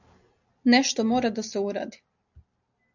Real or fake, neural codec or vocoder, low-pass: real; none; 7.2 kHz